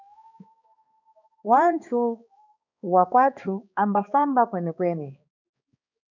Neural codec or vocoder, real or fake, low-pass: codec, 16 kHz, 2 kbps, X-Codec, HuBERT features, trained on balanced general audio; fake; 7.2 kHz